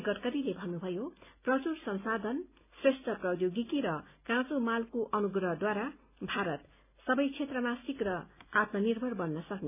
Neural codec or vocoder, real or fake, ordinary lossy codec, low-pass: none; real; none; 3.6 kHz